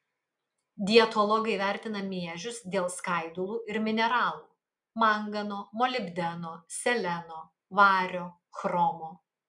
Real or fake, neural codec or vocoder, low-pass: real; none; 10.8 kHz